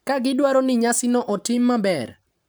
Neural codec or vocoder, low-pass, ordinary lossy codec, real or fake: vocoder, 44.1 kHz, 128 mel bands every 512 samples, BigVGAN v2; none; none; fake